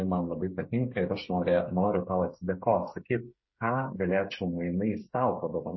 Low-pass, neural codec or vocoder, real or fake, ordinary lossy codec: 7.2 kHz; codec, 16 kHz, 8 kbps, FreqCodec, smaller model; fake; MP3, 24 kbps